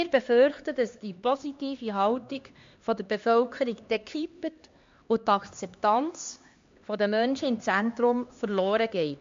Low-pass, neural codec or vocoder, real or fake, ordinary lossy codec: 7.2 kHz; codec, 16 kHz, 2 kbps, X-Codec, HuBERT features, trained on LibriSpeech; fake; MP3, 48 kbps